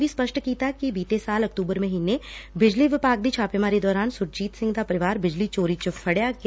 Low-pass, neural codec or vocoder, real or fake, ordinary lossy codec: none; none; real; none